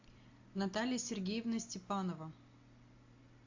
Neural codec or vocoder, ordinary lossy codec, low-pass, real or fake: none; AAC, 48 kbps; 7.2 kHz; real